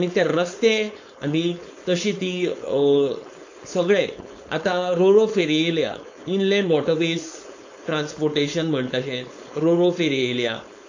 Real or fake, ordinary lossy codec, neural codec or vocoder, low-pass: fake; AAC, 48 kbps; codec, 16 kHz, 4.8 kbps, FACodec; 7.2 kHz